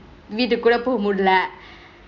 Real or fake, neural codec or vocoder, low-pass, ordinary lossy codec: real; none; 7.2 kHz; none